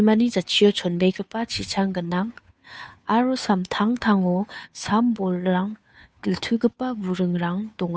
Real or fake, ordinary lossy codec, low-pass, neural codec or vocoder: fake; none; none; codec, 16 kHz, 2 kbps, FunCodec, trained on Chinese and English, 25 frames a second